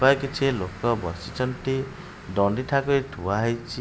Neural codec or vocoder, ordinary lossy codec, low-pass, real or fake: none; none; none; real